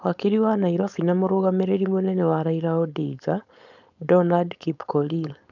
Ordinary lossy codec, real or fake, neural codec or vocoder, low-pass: none; fake; codec, 16 kHz, 4.8 kbps, FACodec; 7.2 kHz